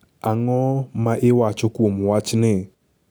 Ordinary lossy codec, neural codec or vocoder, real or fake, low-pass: none; none; real; none